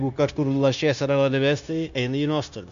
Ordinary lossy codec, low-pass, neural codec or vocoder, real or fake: AAC, 96 kbps; 7.2 kHz; codec, 16 kHz, 0.9 kbps, LongCat-Audio-Codec; fake